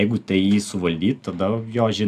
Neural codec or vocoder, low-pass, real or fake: none; 14.4 kHz; real